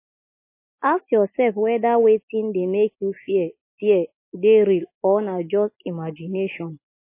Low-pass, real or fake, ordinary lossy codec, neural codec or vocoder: 3.6 kHz; real; MP3, 24 kbps; none